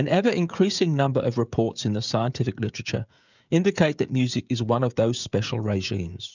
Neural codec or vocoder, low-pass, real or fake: codec, 16 kHz, 16 kbps, FreqCodec, smaller model; 7.2 kHz; fake